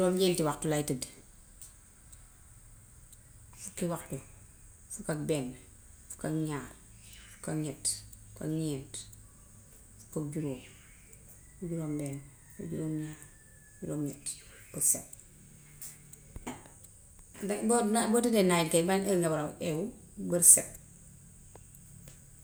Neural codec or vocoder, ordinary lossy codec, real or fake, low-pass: none; none; real; none